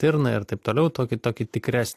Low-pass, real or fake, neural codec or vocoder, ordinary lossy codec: 14.4 kHz; real; none; AAC, 64 kbps